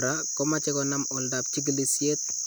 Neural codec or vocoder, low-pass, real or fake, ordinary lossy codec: none; none; real; none